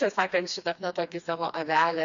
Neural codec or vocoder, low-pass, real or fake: codec, 16 kHz, 2 kbps, FreqCodec, smaller model; 7.2 kHz; fake